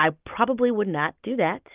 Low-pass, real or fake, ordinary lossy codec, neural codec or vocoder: 3.6 kHz; real; Opus, 24 kbps; none